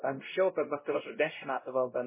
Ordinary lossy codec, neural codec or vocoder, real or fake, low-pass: MP3, 16 kbps; codec, 16 kHz, 0.5 kbps, X-Codec, WavLM features, trained on Multilingual LibriSpeech; fake; 3.6 kHz